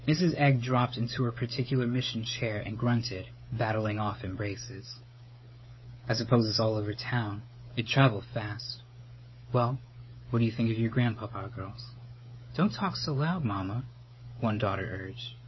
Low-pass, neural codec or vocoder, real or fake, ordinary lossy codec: 7.2 kHz; codec, 16 kHz, 16 kbps, FreqCodec, smaller model; fake; MP3, 24 kbps